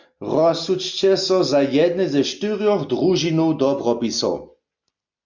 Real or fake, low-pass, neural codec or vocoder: real; 7.2 kHz; none